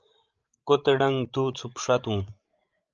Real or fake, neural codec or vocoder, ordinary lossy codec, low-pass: fake; codec, 16 kHz, 16 kbps, FreqCodec, larger model; Opus, 24 kbps; 7.2 kHz